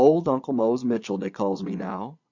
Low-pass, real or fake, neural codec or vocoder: 7.2 kHz; fake; vocoder, 22.05 kHz, 80 mel bands, Vocos